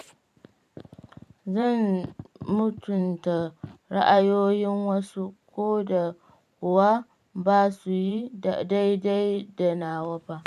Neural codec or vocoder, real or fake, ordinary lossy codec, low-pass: vocoder, 44.1 kHz, 128 mel bands every 512 samples, BigVGAN v2; fake; none; 14.4 kHz